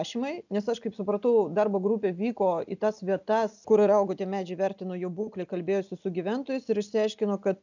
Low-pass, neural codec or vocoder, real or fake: 7.2 kHz; none; real